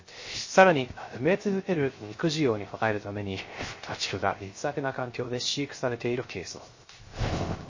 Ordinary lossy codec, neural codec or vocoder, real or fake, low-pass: MP3, 32 kbps; codec, 16 kHz, 0.3 kbps, FocalCodec; fake; 7.2 kHz